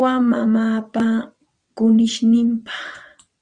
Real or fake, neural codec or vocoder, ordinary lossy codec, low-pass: fake; vocoder, 22.05 kHz, 80 mel bands, WaveNeXt; Opus, 64 kbps; 9.9 kHz